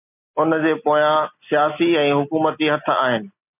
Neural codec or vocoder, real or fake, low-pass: none; real; 3.6 kHz